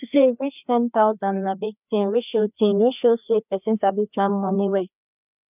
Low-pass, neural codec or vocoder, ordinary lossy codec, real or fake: 3.6 kHz; codec, 16 kHz, 2 kbps, FreqCodec, larger model; none; fake